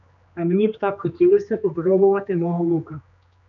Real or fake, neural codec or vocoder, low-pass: fake; codec, 16 kHz, 2 kbps, X-Codec, HuBERT features, trained on general audio; 7.2 kHz